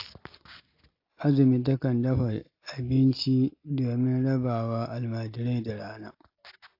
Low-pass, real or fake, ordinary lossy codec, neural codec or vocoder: 5.4 kHz; real; AAC, 32 kbps; none